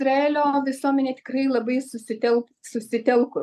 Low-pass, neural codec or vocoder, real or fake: 14.4 kHz; none; real